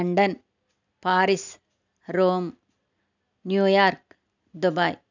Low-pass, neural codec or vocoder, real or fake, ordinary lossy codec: 7.2 kHz; none; real; none